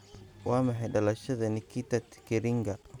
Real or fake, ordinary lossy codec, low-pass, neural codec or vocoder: real; none; 19.8 kHz; none